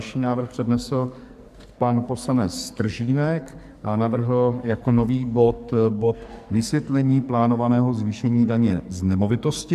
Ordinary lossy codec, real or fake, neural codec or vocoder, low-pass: AAC, 96 kbps; fake; codec, 44.1 kHz, 2.6 kbps, SNAC; 14.4 kHz